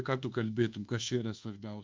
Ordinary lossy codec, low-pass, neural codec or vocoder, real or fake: Opus, 24 kbps; 7.2 kHz; codec, 24 kHz, 1.2 kbps, DualCodec; fake